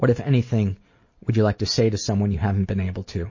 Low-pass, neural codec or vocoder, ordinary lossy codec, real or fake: 7.2 kHz; none; MP3, 32 kbps; real